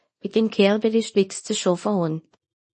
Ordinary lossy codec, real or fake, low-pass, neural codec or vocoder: MP3, 32 kbps; fake; 10.8 kHz; codec, 24 kHz, 0.9 kbps, WavTokenizer, small release